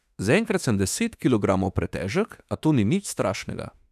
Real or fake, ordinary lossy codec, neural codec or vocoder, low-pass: fake; none; autoencoder, 48 kHz, 32 numbers a frame, DAC-VAE, trained on Japanese speech; 14.4 kHz